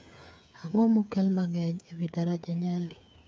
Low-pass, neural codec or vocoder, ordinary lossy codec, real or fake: none; codec, 16 kHz, 8 kbps, FreqCodec, smaller model; none; fake